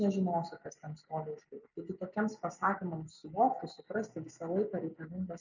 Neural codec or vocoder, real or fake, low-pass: none; real; 7.2 kHz